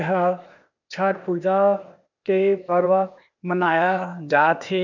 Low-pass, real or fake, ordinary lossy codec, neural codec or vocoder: 7.2 kHz; fake; none; codec, 16 kHz, 0.8 kbps, ZipCodec